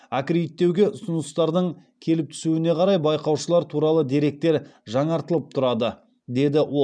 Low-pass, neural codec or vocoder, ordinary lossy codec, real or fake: 9.9 kHz; none; none; real